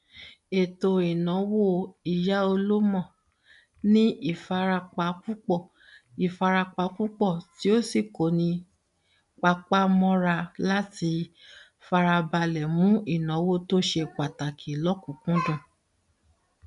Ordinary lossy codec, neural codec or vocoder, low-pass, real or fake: none; none; 10.8 kHz; real